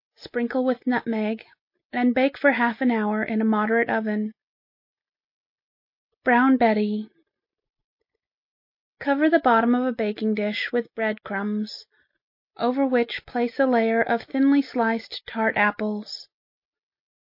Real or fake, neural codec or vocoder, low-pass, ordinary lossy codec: real; none; 5.4 kHz; MP3, 32 kbps